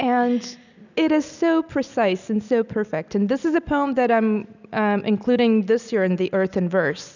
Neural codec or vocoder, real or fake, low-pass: none; real; 7.2 kHz